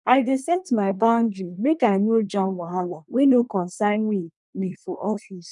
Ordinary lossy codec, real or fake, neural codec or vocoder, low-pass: none; fake; codec, 24 kHz, 1 kbps, SNAC; 10.8 kHz